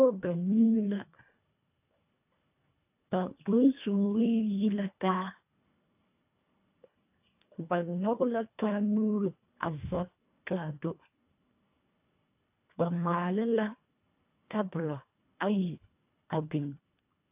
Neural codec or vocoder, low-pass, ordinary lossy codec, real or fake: codec, 24 kHz, 1.5 kbps, HILCodec; 3.6 kHz; AAC, 32 kbps; fake